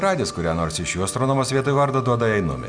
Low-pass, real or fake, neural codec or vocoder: 9.9 kHz; real; none